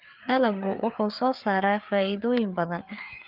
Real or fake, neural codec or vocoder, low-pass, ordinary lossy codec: fake; codec, 16 kHz, 4 kbps, FreqCodec, larger model; 5.4 kHz; Opus, 24 kbps